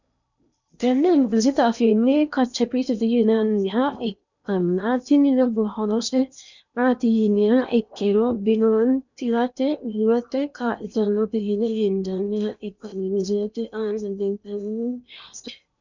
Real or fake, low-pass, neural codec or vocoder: fake; 7.2 kHz; codec, 16 kHz in and 24 kHz out, 0.8 kbps, FocalCodec, streaming, 65536 codes